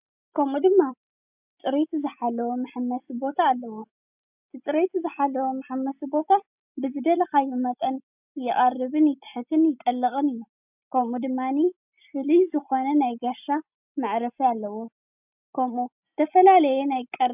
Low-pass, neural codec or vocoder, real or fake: 3.6 kHz; none; real